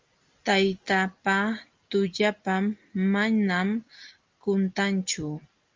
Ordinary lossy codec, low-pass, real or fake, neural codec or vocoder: Opus, 32 kbps; 7.2 kHz; real; none